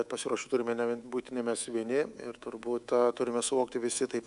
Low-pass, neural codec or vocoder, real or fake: 10.8 kHz; codec, 24 kHz, 3.1 kbps, DualCodec; fake